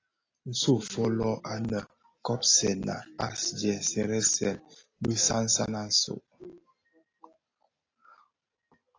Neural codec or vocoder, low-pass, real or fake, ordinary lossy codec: none; 7.2 kHz; real; AAC, 32 kbps